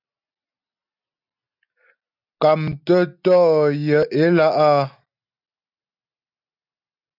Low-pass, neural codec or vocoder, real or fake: 5.4 kHz; none; real